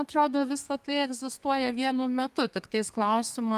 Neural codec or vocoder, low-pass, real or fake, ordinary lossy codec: codec, 32 kHz, 1.9 kbps, SNAC; 14.4 kHz; fake; Opus, 32 kbps